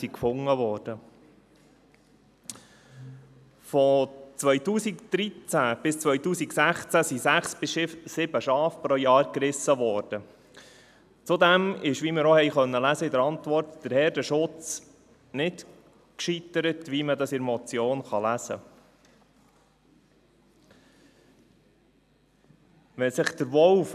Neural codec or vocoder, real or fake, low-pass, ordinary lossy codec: none; real; 14.4 kHz; none